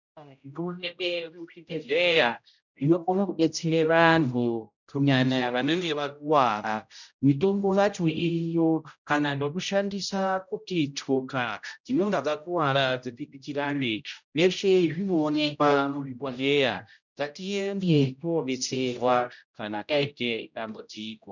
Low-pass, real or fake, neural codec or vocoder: 7.2 kHz; fake; codec, 16 kHz, 0.5 kbps, X-Codec, HuBERT features, trained on general audio